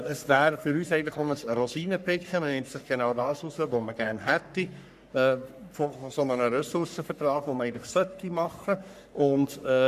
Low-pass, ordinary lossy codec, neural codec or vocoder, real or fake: 14.4 kHz; none; codec, 44.1 kHz, 3.4 kbps, Pupu-Codec; fake